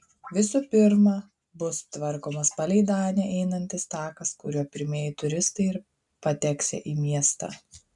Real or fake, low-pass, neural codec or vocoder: real; 10.8 kHz; none